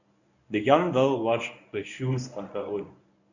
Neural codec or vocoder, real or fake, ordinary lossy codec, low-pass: codec, 24 kHz, 0.9 kbps, WavTokenizer, medium speech release version 1; fake; none; 7.2 kHz